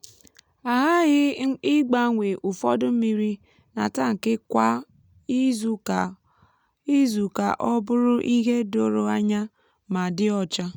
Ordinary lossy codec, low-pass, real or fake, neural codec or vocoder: none; 19.8 kHz; real; none